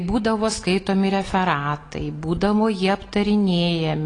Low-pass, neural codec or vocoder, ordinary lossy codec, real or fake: 9.9 kHz; none; AAC, 32 kbps; real